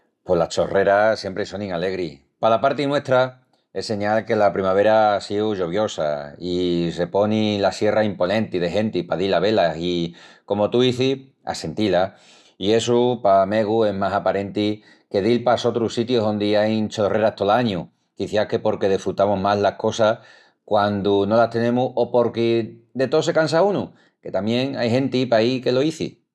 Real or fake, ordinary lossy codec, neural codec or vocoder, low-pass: real; none; none; none